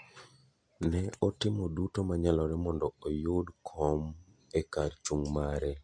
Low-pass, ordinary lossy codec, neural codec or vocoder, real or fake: 9.9 kHz; MP3, 48 kbps; none; real